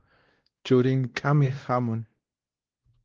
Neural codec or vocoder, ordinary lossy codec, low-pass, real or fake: codec, 16 kHz, 1 kbps, X-Codec, WavLM features, trained on Multilingual LibriSpeech; Opus, 16 kbps; 7.2 kHz; fake